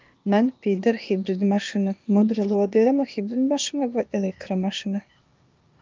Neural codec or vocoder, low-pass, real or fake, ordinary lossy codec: codec, 16 kHz, 0.8 kbps, ZipCodec; 7.2 kHz; fake; Opus, 24 kbps